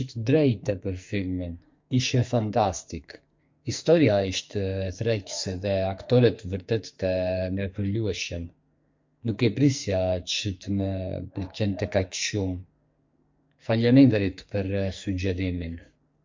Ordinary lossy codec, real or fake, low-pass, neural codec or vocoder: MP3, 48 kbps; fake; 7.2 kHz; codec, 44.1 kHz, 2.6 kbps, SNAC